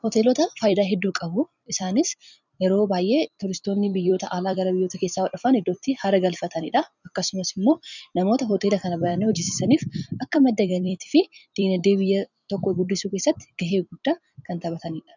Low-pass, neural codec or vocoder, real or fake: 7.2 kHz; vocoder, 44.1 kHz, 128 mel bands every 256 samples, BigVGAN v2; fake